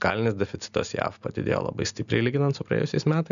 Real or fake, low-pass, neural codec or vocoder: real; 7.2 kHz; none